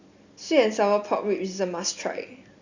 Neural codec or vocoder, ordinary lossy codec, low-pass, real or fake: none; Opus, 64 kbps; 7.2 kHz; real